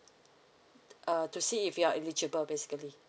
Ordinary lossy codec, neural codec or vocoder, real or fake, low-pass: none; none; real; none